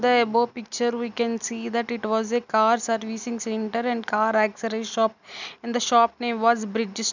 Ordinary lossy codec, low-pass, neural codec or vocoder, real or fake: none; 7.2 kHz; none; real